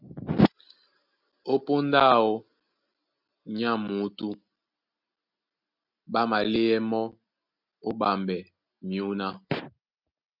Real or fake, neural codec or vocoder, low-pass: real; none; 5.4 kHz